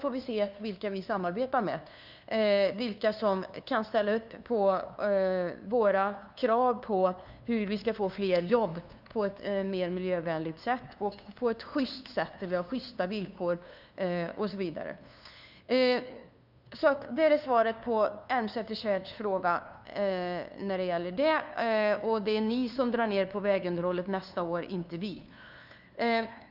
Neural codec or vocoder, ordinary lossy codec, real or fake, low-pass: codec, 16 kHz, 2 kbps, FunCodec, trained on LibriTTS, 25 frames a second; none; fake; 5.4 kHz